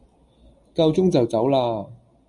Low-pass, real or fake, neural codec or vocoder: 10.8 kHz; real; none